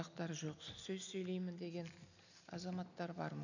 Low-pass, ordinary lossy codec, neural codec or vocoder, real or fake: 7.2 kHz; none; none; real